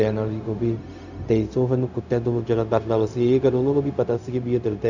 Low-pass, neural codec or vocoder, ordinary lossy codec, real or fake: 7.2 kHz; codec, 16 kHz, 0.4 kbps, LongCat-Audio-Codec; none; fake